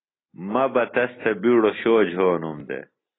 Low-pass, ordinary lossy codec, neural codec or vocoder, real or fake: 7.2 kHz; AAC, 16 kbps; none; real